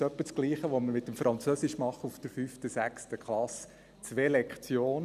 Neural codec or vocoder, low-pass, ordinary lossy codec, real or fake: none; 14.4 kHz; none; real